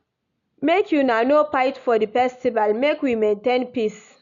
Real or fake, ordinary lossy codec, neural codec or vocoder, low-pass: real; none; none; 7.2 kHz